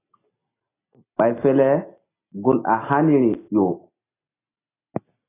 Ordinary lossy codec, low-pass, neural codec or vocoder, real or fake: AAC, 16 kbps; 3.6 kHz; none; real